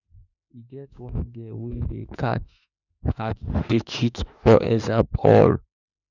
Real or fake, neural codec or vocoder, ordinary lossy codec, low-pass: fake; autoencoder, 48 kHz, 32 numbers a frame, DAC-VAE, trained on Japanese speech; none; 7.2 kHz